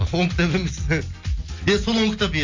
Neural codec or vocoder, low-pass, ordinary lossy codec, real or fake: none; 7.2 kHz; MP3, 64 kbps; real